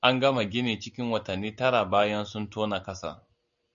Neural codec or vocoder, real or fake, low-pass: none; real; 7.2 kHz